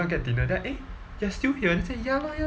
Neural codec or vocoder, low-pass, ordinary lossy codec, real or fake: none; none; none; real